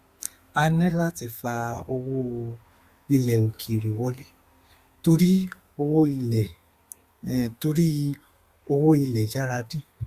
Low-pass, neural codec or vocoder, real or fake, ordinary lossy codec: 14.4 kHz; codec, 32 kHz, 1.9 kbps, SNAC; fake; Opus, 64 kbps